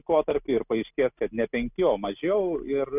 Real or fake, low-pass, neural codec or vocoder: real; 3.6 kHz; none